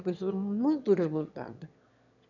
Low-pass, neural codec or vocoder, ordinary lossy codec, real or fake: 7.2 kHz; autoencoder, 22.05 kHz, a latent of 192 numbers a frame, VITS, trained on one speaker; none; fake